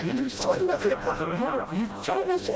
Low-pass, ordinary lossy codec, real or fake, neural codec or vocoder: none; none; fake; codec, 16 kHz, 0.5 kbps, FreqCodec, smaller model